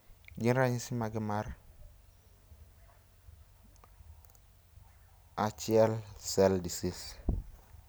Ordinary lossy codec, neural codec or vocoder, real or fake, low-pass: none; none; real; none